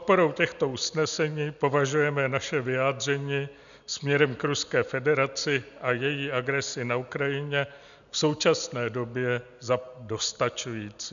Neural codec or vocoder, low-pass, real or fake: none; 7.2 kHz; real